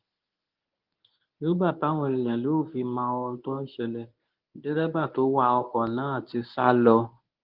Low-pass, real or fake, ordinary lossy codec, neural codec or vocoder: 5.4 kHz; fake; Opus, 32 kbps; codec, 24 kHz, 0.9 kbps, WavTokenizer, medium speech release version 1